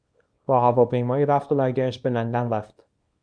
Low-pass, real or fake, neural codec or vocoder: 9.9 kHz; fake; codec, 24 kHz, 0.9 kbps, WavTokenizer, small release